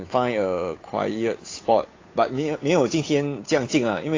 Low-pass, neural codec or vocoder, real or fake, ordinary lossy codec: 7.2 kHz; codec, 16 kHz, 8 kbps, FunCodec, trained on Chinese and English, 25 frames a second; fake; AAC, 32 kbps